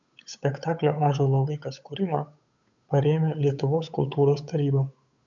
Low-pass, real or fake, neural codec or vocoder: 7.2 kHz; fake; codec, 16 kHz, 8 kbps, FunCodec, trained on Chinese and English, 25 frames a second